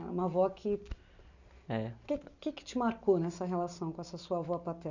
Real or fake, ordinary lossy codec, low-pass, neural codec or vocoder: real; none; 7.2 kHz; none